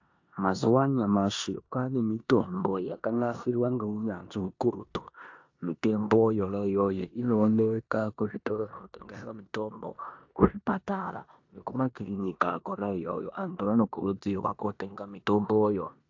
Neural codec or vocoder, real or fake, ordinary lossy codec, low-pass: codec, 16 kHz in and 24 kHz out, 0.9 kbps, LongCat-Audio-Codec, four codebook decoder; fake; AAC, 48 kbps; 7.2 kHz